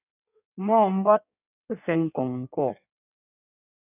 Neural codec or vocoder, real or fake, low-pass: codec, 16 kHz in and 24 kHz out, 1.1 kbps, FireRedTTS-2 codec; fake; 3.6 kHz